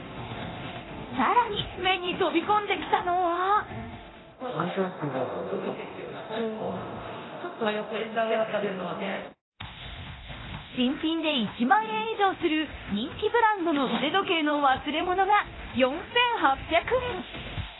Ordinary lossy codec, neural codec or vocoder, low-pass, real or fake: AAC, 16 kbps; codec, 24 kHz, 0.9 kbps, DualCodec; 7.2 kHz; fake